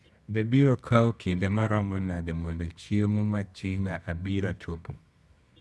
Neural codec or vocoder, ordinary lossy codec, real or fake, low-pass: codec, 24 kHz, 0.9 kbps, WavTokenizer, medium music audio release; none; fake; none